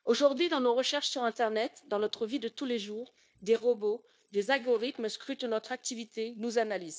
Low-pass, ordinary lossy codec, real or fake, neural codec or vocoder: none; none; fake; codec, 16 kHz, 0.9 kbps, LongCat-Audio-Codec